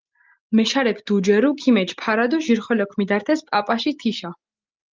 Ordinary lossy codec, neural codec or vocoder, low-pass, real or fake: Opus, 32 kbps; none; 7.2 kHz; real